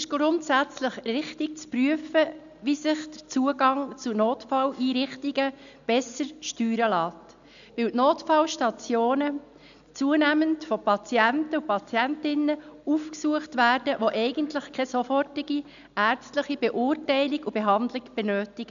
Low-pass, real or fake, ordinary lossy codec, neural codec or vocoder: 7.2 kHz; real; none; none